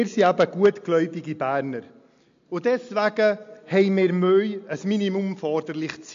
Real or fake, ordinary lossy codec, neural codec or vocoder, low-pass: real; MP3, 48 kbps; none; 7.2 kHz